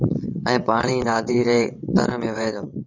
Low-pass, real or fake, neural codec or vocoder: 7.2 kHz; fake; vocoder, 22.05 kHz, 80 mel bands, WaveNeXt